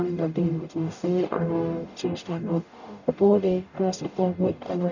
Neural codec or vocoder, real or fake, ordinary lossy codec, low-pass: codec, 44.1 kHz, 0.9 kbps, DAC; fake; none; 7.2 kHz